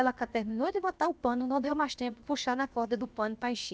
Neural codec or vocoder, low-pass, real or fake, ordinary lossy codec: codec, 16 kHz, about 1 kbps, DyCAST, with the encoder's durations; none; fake; none